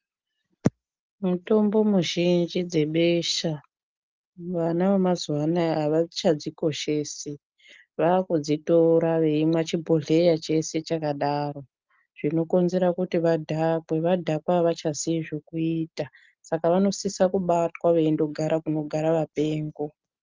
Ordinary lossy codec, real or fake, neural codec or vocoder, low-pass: Opus, 32 kbps; real; none; 7.2 kHz